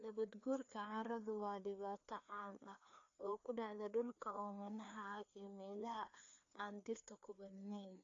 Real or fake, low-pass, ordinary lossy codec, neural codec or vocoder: fake; 7.2 kHz; AAC, 48 kbps; codec, 16 kHz, 2 kbps, FreqCodec, larger model